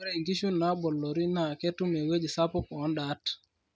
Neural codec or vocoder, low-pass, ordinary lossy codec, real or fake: none; none; none; real